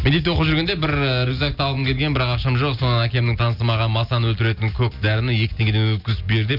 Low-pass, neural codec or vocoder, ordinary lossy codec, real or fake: 5.4 kHz; none; none; real